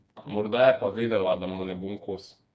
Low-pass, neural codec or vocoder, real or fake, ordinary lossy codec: none; codec, 16 kHz, 2 kbps, FreqCodec, smaller model; fake; none